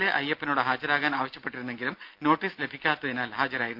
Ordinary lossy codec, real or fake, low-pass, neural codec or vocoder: Opus, 24 kbps; real; 5.4 kHz; none